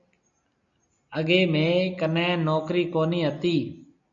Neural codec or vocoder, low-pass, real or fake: none; 7.2 kHz; real